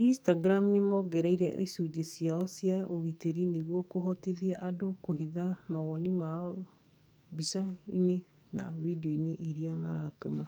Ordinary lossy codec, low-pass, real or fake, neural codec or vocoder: none; none; fake; codec, 44.1 kHz, 2.6 kbps, SNAC